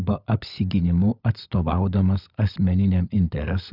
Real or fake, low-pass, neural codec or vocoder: fake; 5.4 kHz; codec, 16 kHz, 16 kbps, FunCodec, trained on LibriTTS, 50 frames a second